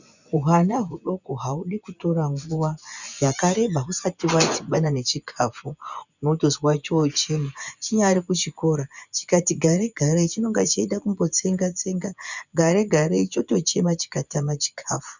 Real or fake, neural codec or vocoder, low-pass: fake; vocoder, 24 kHz, 100 mel bands, Vocos; 7.2 kHz